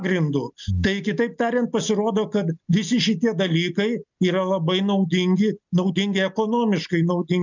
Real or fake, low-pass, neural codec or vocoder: real; 7.2 kHz; none